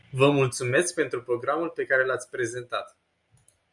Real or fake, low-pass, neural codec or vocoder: real; 10.8 kHz; none